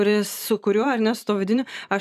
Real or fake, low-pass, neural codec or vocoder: fake; 14.4 kHz; vocoder, 44.1 kHz, 128 mel bands every 512 samples, BigVGAN v2